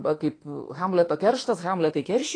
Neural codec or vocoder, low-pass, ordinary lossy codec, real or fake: autoencoder, 48 kHz, 32 numbers a frame, DAC-VAE, trained on Japanese speech; 9.9 kHz; AAC, 32 kbps; fake